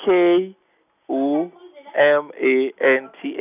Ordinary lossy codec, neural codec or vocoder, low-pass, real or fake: none; none; 3.6 kHz; real